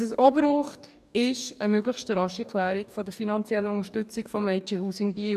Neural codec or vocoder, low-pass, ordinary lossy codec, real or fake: codec, 44.1 kHz, 2.6 kbps, DAC; 14.4 kHz; none; fake